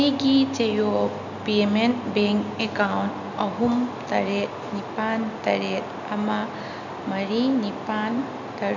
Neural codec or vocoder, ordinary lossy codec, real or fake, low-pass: none; none; real; 7.2 kHz